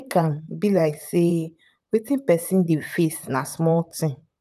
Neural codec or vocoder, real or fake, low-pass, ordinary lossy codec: vocoder, 44.1 kHz, 128 mel bands, Pupu-Vocoder; fake; 14.4 kHz; none